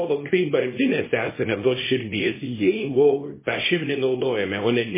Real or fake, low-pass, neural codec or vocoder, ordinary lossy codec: fake; 3.6 kHz; codec, 24 kHz, 0.9 kbps, WavTokenizer, small release; MP3, 16 kbps